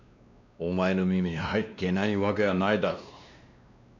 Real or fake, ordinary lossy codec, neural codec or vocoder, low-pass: fake; none; codec, 16 kHz, 2 kbps, X-Codec, WavLM features, trained on Multilingual LibriSpeech; 7.2 kHz